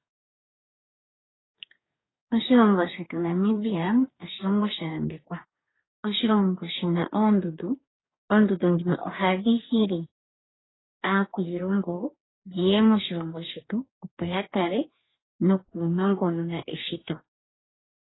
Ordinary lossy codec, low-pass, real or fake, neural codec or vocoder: AAC, 16 kbps; 7.2 kHz; fake; codec, 44.1 kHz, 2.6 kbps, DAC